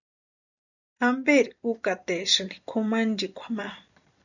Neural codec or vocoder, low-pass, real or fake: vocoder, 44.1 kHz, 128 mel bands every 256 samples, BigVGAN v2; 7.2 kHz; fake